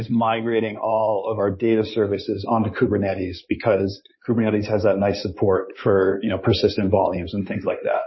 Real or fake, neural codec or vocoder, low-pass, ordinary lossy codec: fake; codec, 16 kHz in and 24 kHz out, 2.2 kbps, FireRedTTS-2 codec; 7.2 kHz; MP3, 24 kbps